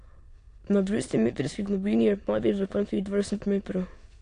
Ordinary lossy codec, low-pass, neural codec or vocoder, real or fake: AAC, 48 kbps; 9.9 kHz; autoencoder, 22.05 kHz, a latent of 192 numbers a frame, VITS, trained on many speakers; fake